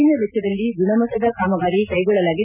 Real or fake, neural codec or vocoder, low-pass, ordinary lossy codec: real; none; 3.6 kHz; none